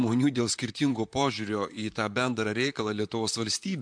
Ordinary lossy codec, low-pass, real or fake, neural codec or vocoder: MP3, 64 kbps; 9.9 kHz; fake; vocoder, 44.1 kHz, 128 mel bands, Pupu-Vocoder